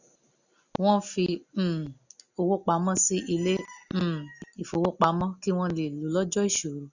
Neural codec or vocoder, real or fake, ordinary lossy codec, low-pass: none; real; none; 7.2 kHz